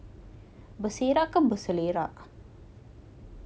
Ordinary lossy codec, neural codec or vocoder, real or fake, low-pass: none; none; real; none